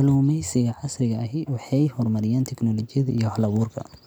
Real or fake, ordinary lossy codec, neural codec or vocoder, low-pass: real; none; none; none